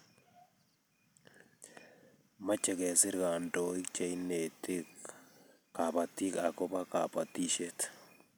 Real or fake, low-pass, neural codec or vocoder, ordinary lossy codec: real; none; none; none